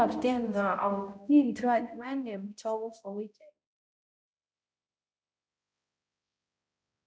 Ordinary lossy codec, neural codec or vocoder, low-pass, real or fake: none; codec, 16 kHz, 0.5 kbps, X-Codec, HuBERT features, trained on balanced general audio; none; fake